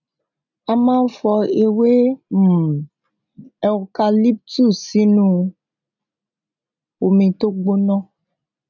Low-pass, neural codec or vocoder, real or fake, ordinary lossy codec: 7.2 kHz; none; real; none